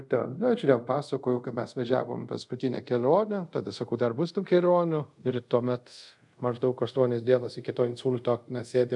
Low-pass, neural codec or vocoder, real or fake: 10.8 kHz; codec, 24 kHz, 0.5 kbps, DualCodec; fake